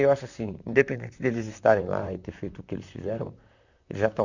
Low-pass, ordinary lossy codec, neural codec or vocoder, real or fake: 7.2 kHz; none; vocoder, 44.1 kHz, 128 mel bands, Pupu-Vocoder; fake